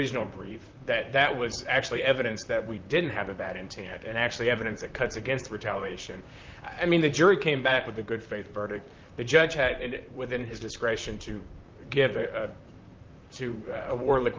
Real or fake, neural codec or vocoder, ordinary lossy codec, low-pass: fake; vocoder, 44.1 kHz, 128 mel bands, Pupu-Vocoder; Opus, 24 kbps; 7.2 kHz